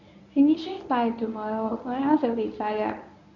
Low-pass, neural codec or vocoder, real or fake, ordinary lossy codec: 7.2 kHz; codec, 24 kHz, 0.9 kbps, WavTokenizer, medium speech release version 1; fake; none